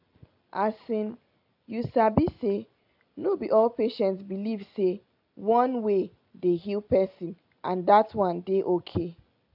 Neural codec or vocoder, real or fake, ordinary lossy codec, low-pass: none; real; AAC, 48 kbps; 5.4 kHz